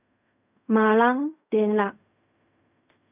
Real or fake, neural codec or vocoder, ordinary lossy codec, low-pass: fake; codec, 16 kHz in and 24 kHz out, 0.4 kbps, LongCat-Audio-Codec, fine tuned four codebook decoder; none; 3.6 kHz